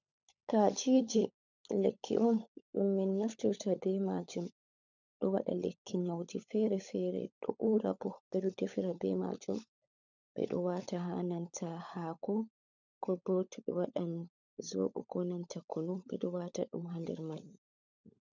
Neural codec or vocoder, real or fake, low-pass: codec, 16 kHz, 16 kbps, FunCodec, trained on LibriTTS, 50 frames a second; fake; 7.2 kHz